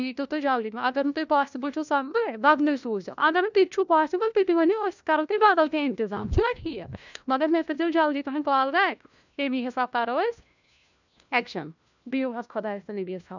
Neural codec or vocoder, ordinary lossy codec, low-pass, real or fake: codec, 16 kHz, 1 kbps, FunCodec, trained on LibriTTS, 50 frames a second; none; 7.2 kHz; fake